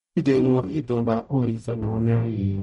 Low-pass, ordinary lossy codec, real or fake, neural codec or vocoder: 19.8 kHz; MP3, 48 kbps; fake; codec, 44.1 kHz, 0.9 kbps, DAC